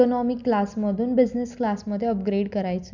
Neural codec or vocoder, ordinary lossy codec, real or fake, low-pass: none; none; real; 7.2 kHz